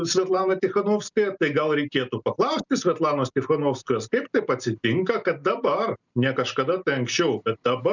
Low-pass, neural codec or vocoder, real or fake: 7.2 kHz; none; real